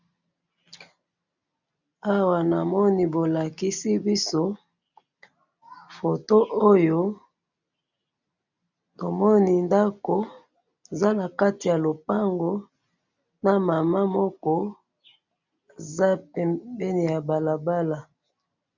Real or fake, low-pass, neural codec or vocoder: real; 7.2 kHz; none